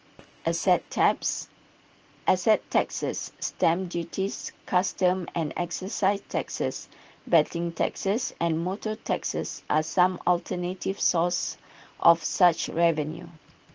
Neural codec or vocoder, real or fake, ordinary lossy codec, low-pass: none; real; Opus, 16 kbps; 7.2 kHz